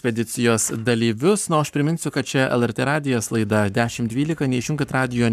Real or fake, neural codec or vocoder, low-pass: fake; codec, 44.1 kHz, 7.8 kbps, Pupu-Codec; 14.4 kHz